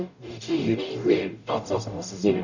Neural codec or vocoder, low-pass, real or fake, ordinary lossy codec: codec, 44.1 kHz, 0.9 kbps, DAC; 7.2 kHz; fake; none